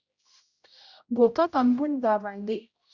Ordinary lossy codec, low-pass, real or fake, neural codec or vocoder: Opus, 64 kbps; 7.2 kHz; fake; codec, 16 kHz, 0.5 kbps, X-Codec, HuBERT features, trained on general audio